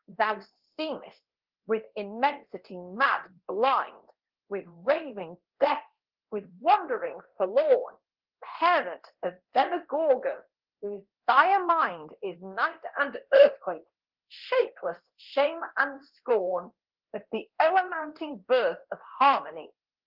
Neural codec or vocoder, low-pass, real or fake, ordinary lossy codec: codec, 24 kHz, 0.9 kbps, DualCodec; 5.4 kHz; fake; Opus, 16 kbps